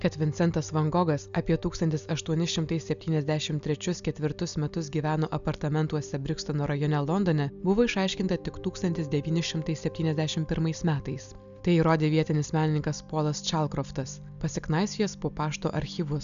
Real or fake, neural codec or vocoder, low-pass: real; none; 7.2 kHz